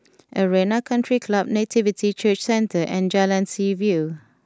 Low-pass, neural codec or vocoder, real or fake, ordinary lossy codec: none; none; real; none